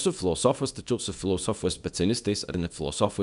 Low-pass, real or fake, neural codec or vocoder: 10.8 kHz; fake; codec, 24 kHz, 0.9 kbps, WavTokenizer, small release